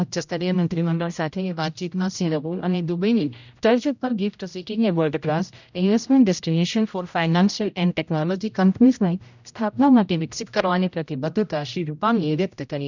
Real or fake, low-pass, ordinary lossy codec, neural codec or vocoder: fake; 7.2 kHz; none; codec, 16 kHz, 0.5 kbps, X-Codec, HuBERT features, trained on general audio